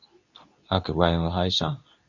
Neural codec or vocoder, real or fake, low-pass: codec, 24 kHz, 0.9 kbps, WavTokenizer, medium speech release version 2; fake; 7.2 kHz